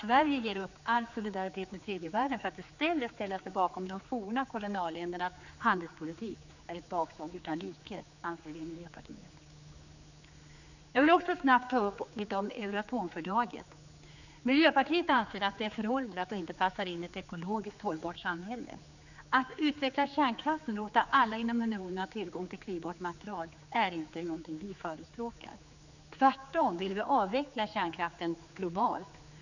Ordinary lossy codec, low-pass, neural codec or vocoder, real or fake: none; 7.2 kHz; codec, 16 kHz, 4 kbps, X-Codec, HuBERT features, trained on general audio; fake